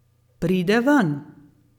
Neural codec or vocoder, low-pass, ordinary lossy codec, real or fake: none; 19.8 kHz; none; real